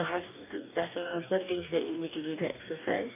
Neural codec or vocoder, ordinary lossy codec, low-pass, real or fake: codec, 44.1 kHz, 2.6 kbps, DAC; none; 3.6 kHz; fake